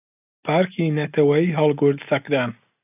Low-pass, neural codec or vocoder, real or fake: 3.6 kHz; none; real